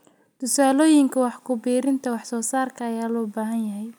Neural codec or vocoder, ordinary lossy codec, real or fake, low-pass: none; none; real; none